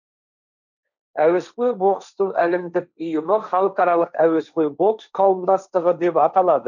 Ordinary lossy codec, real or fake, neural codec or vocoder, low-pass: none; fake; codec, 16 kHz, 1.1 kbps, Voila-Tokenizer; 7.2 kHz